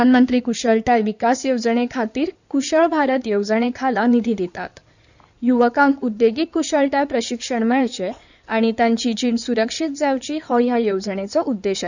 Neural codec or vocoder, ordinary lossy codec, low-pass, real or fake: codec, 16 kHz in and 24 kHz out, 2.2 kbps, FireRedTTS-2 codec; none; 7.2 kHz; fake